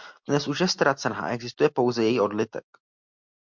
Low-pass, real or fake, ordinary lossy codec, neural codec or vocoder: 7.2 kHz; real; MP3, 64 kbps; none